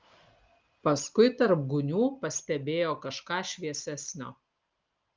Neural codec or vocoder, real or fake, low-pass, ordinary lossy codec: none; real; 7.2 kHz; Opus, 32 kbps